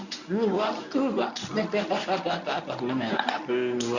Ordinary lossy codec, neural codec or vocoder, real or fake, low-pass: none; codec, 24 kHz, 0.9 kbps, WavTokenizer, medium speech release version 1; fake; 7.2 kHz